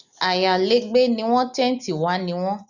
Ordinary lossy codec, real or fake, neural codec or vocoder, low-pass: none; real; none; 7.2 kHz